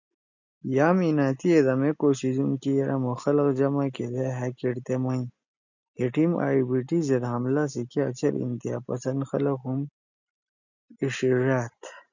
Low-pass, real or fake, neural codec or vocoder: 7.2 kHz; real; none